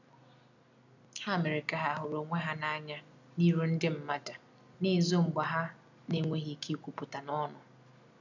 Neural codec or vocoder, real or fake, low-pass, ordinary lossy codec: none; real; 7.2 kHz; none